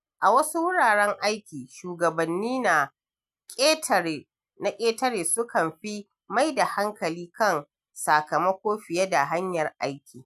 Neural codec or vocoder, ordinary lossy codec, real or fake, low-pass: none; none; real; 14.4 kHz